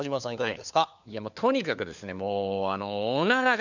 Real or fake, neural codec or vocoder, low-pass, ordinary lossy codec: fake; codec, 16 kHz, 4 kbps, FunCodec, trained on LibriTTS, 50 frames a second; 7.2 kHz; none